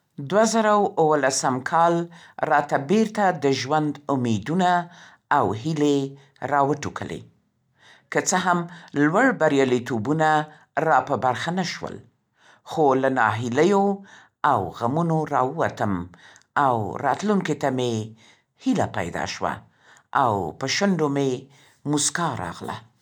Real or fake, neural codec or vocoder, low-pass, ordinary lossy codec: real; none; 19.8 kHz; none